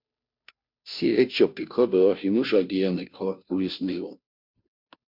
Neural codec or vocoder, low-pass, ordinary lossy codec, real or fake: codec, 16 kHz, 0.5 kbps, FunCodec, trained on Chinese and English, 25 frames a second; 5.4 kHz; MP3, 48 kbps; fake